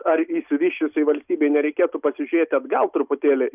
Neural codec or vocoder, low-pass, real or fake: none; 3.6 kHz; real